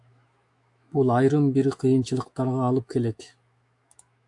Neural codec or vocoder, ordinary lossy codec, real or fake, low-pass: autoencoder, 48 kHz, 128 numbers a frame, DAC-VAE, trained on Japanese speech; AAC, 64 kbps; fake; 10.8 kHz